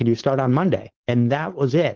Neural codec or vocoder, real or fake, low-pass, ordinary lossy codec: codec, 16 kHz, 4.8 kbps, FACodec; fake; 7.2 kHz; Opus, 16 kbps